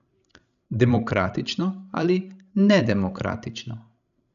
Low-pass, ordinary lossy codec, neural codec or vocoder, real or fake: 7.2 kHz; none; codec, 16 kHz, 16 kbps, FreqCodec, larger model; fake